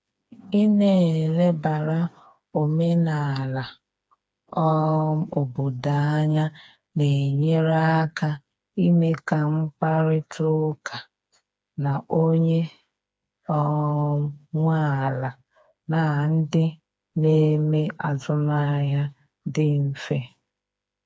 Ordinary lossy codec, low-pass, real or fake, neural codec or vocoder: none; none; fake; codec, 16 kHz, 4 kbps, FreqCodec, smaller model